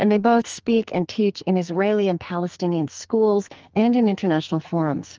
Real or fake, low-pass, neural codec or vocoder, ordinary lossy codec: fake; 7.2 kHz; codec, 44.1 kHz, 2.6 kbps, SNAC; Opus, 24 kbps